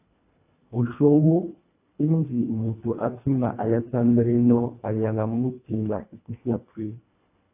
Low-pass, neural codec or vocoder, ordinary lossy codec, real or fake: 3.6 kHz; codec, 24 kHz, 1.5 kbps, HILCodec; AAC, 24 kbps; fake